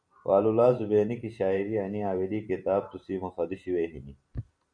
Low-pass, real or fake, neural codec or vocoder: 9.9 kHz; fake; vocoder, 44.1 kHz, 128 mel bands every 512 samples, BigVGAN v2